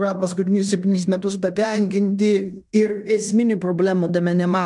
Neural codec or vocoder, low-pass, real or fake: codec, 16 kHz in and 24 kHz out, 0.9 kbps, LongCat-Audio-Codec, fine tuned four codebook decoder; 10.8 kHz; fake